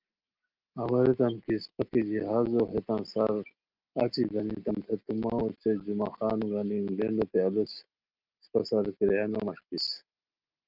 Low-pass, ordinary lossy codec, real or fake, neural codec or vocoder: 5.4 kHz; Opus, 24 kbps; real; none